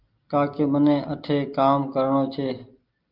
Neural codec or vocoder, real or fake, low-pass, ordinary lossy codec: none; real; 5.4 kHz; Opus, 24 kbps